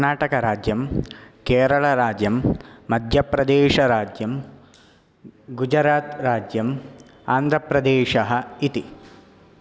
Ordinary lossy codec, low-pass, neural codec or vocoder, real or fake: none; none; none; real